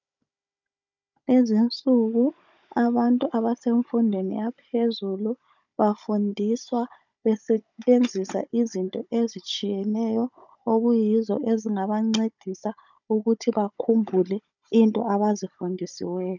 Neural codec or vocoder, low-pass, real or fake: codec, 16 kHz, 16 kbps, FunCodec, trained on Chinese and English, 50 frames a second; 7.2 kHz; fake